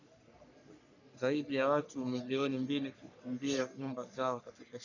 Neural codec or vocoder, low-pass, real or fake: codec, 44.1 kHz, 3.4 kbps, Pupu-Codec; 7.2 kHz; fake